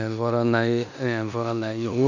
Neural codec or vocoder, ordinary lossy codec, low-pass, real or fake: codec, 16 kHz in and 24 kHz out, 0.9 kbps, LongCat-Audio-Codec, four codebook decoder; none; 7.2 kHz; fake